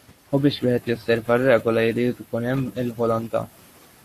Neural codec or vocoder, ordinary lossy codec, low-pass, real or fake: codec, 44.1 kHz, 7.8 kbps, Pupu-Codec; AAC, 64 kbps; 14.4 kHz; fake